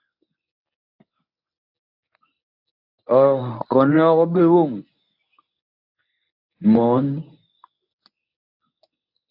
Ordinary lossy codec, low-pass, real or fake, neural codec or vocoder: AAC, 48 kbps; 5.4 kHz; fake; codec, 24 kHz, 0.9 kbps, WavTokenizer, medium speech release version 1